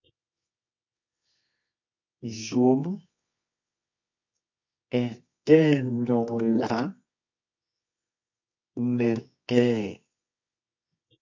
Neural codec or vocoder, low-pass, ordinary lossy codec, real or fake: codec, 24 kHz, 0.9 kbps, WavTokenizer, medium music audio release; 7.2 kHz; MP3, 48 kbps; fake